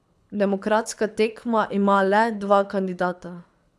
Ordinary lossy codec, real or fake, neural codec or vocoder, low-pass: none; fake; codec, 24 kHz, 6 kbps, HILCodec; none